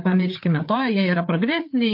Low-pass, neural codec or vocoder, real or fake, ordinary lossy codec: 5.4 kHz; codec, 16 kHz, 8 kbps, FreqCodec, larger model; fake; MP3, 32 kbps